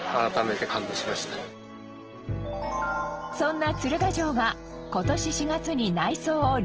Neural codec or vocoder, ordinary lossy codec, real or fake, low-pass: none; Opus, 16 kbps; real; 7.2 kHz